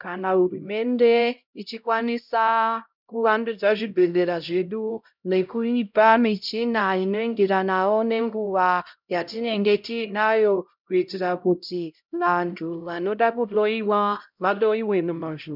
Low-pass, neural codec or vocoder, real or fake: 5.4 kHz; codec, 16 kHz, 0.5 kbps, X-Codec, HuBERT features, trained on LibriSpeech; fake